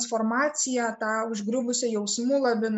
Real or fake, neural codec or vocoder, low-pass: real; none; 9.9 kHz